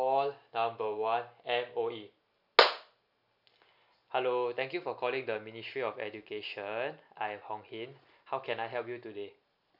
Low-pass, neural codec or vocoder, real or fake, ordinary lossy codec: 5.4 kHz; none; real; none